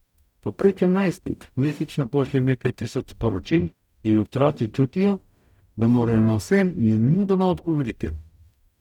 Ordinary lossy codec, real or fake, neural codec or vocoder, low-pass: none; fake; codec, 44.1 kHz, 0.9 kbps, DAC; 19.8 kHz